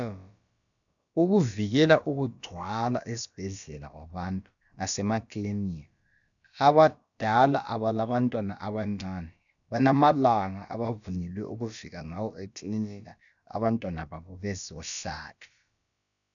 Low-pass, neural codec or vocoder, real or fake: 7.2 kHz; codec, 16 kHz, about 1 kbps, DyCAST, with the encoder's durations; fake